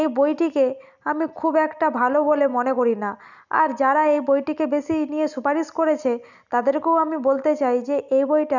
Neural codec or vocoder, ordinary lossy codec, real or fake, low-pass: none; none; real; 7.2 kHz